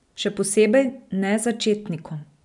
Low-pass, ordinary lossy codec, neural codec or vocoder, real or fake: 10.8 kHz; none; none; real